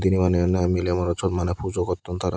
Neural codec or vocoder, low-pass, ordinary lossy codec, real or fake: none; none; none; real